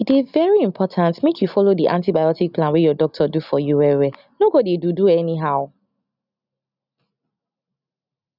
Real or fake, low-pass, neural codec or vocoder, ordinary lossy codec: real; 5.4 kHz; none; none